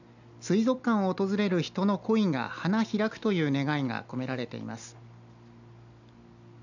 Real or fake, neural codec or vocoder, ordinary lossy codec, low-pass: real; none; none; 7.2 kHz